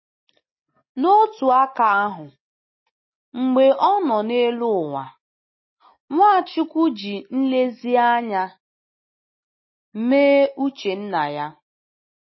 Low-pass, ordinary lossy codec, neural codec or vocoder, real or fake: 7.2 kHz; MP3, 24 kbps; none; real